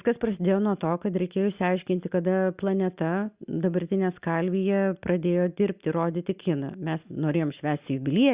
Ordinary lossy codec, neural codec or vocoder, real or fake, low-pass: Opus, 64 kbps; none; real; 3.6 kHz